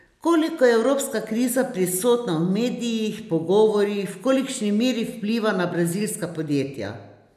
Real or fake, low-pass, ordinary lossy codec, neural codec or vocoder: real; 14.4 kHz; none; none